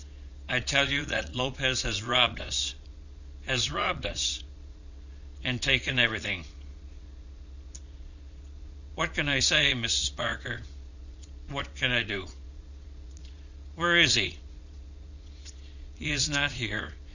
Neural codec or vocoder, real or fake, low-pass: vocoder, 22.05 kHz, 80 mel bands, Vocos; fake; 7.2 kHz